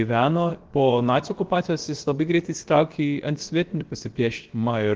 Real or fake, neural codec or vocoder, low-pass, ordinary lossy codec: fake; codec, 16 kHz, about 1 kbps, DyCAST, with the encoder's durations; 7.2 kHz; Opus, 16 kbps